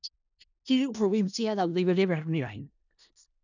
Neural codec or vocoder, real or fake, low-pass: codec, 16 kHz in and 24 kHz out, 0.4 kbps, LongCat-Audio-Codec, four codebook decoder; fake; 7.2 kHz